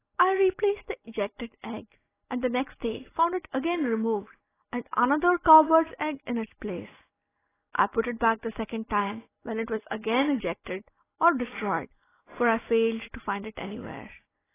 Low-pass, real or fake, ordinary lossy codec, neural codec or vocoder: 3.6 kHz; real; AAC, 16 kbps; none